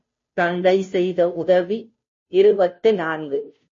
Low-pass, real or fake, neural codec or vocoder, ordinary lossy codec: 7.2 kHz; fake; codec, 16 kHz, 0.5 kbps, FunCodec, trained on Chinese and English, 25 frames a second; MP3, 32 kbps